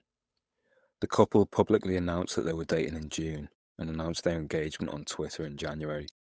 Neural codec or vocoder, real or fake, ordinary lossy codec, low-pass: codec, 16 kHz, 8 kbps, FunCodec, trained on Chinese and English, 25 frames a second; fake; none; none